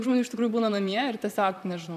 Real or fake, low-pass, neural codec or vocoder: fake; 14.4 kHz; vocoder, 44.1 kHz, 128 mel bands, Pupu-Vocoder